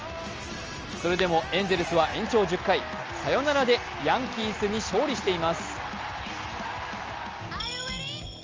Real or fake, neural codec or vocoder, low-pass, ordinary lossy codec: real; none; 7.2 kHz; Opus, 24 kbps